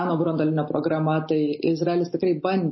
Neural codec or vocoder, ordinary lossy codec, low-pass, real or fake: none; MP3, 24 kbps; 7.2 kHz; real